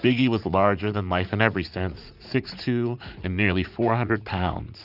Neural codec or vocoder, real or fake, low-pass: vocoder, 44.1 kHz, 80 mel bands, Vocos; fake; 5.4 kHz